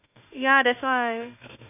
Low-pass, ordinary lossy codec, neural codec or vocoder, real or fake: 3.6 kHz; none; autoencoder, 48 kHz, 32 numbers a frame, DAC-VAE, trained on Japanese speech; fake